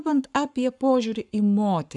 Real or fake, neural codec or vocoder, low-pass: fake; codec, 44.1 kHz, 7.8 kbps, Pupu-Codec; 10.8 kHz